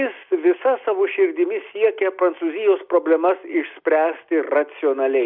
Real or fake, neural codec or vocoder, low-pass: real; none; 5.4 kHz